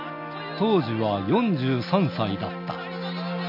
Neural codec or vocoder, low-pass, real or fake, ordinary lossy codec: none; 5.4 kHz; real; AAC, 48 kbps